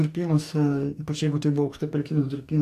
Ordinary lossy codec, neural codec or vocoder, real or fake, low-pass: AAC, 64 kbps; codec, 44.1 kHz, 2.6 kbps, DAC; fake; 14.4 kHz